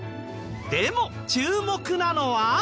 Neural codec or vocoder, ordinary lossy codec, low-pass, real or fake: none; none; none; real